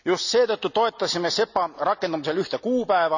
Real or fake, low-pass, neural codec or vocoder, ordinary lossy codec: real; 7.2 kHz; none; none